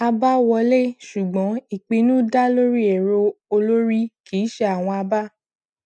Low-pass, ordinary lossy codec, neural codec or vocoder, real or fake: none; none; none; real